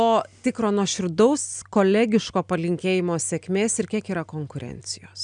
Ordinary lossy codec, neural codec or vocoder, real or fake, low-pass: MP3, 96 kbps; none; real; 9.9 kHz